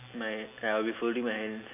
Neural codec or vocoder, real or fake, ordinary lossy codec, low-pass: none; real; AAC, 32 kbps; 3.6 kHz